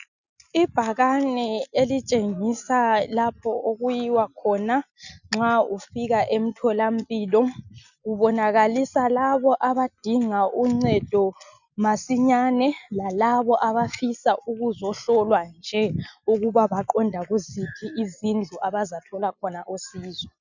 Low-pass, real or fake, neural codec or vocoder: 7.2 kHz; real; none